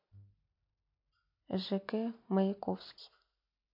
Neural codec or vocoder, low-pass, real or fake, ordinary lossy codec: none; 5.4 kHz; real; MP3, 32 kbps